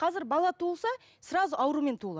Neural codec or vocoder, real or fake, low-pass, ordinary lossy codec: none; real; none; none